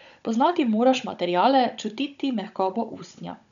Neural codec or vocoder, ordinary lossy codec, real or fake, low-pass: codec, 16 kHz, 16 kbps, FunCodec, trained on Chinese and English, 50 frames a second; none; fake; 7.2 kHz